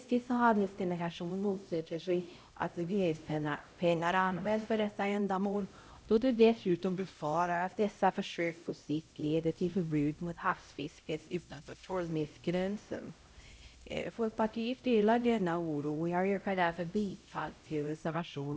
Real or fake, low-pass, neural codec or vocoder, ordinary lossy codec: fake; none; codec, 16 kHz, 0.5 kbps, X-Codec, HuBERT features, trained on LibriSpeech; none